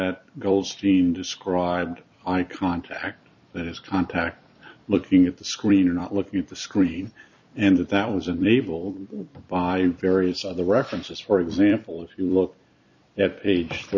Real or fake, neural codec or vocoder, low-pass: fake; vocoder, 44.1 kHz, 128 mel bands every 256 samples, BigVGAN v2; 7.2 kHz